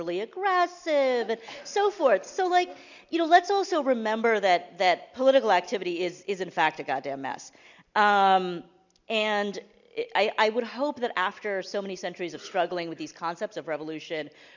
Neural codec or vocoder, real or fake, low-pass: none; real; 7.2 kHz